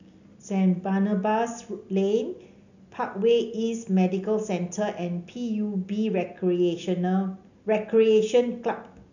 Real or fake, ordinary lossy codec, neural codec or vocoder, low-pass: real; none; none; 7.2 kHz